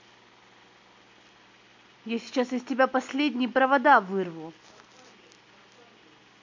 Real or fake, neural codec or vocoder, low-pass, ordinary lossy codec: real; none; 7.2 kHz; MP3, 48 kbps